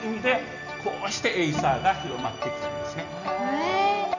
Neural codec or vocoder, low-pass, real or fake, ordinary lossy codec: none; 7.2 kHz; real; none